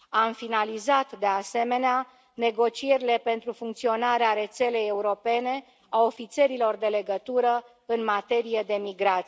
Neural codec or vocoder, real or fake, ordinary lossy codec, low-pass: none; real; none; none